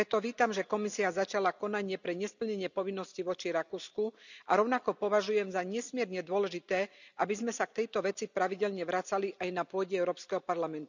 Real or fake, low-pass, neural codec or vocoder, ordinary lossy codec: real; 7.2 kHz; none; none